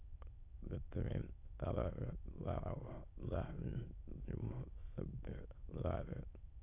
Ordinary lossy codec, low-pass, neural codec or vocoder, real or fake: AAC, 32 kbps; 3.6 kHz; autoencoder, 22.05 kHz, a latent of 192 numbers a frame, VITS, trained on many speakers; fake